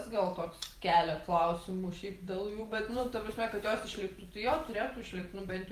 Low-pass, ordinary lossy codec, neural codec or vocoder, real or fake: 14.4 kHz; Opus, 24 kbps; vocoder, 48 kHz, 128 mel bands, Vocos; fake